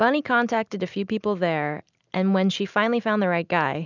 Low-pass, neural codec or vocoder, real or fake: 7.2 kHz; none; real